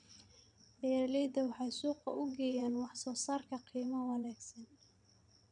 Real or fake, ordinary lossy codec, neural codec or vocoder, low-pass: fake; none; vocoder, 22.05 kHz, 80 mel bands, WaveNeXt; 9.9 kHz